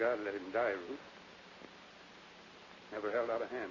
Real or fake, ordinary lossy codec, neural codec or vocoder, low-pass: real; Opus, 64 kbps; none; 7.2 kHz